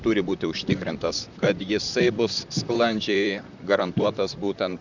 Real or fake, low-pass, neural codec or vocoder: fake; 7.2 kHz; vocoder, 44.1 kHz, 128 mel bands, Pupu-Vocoder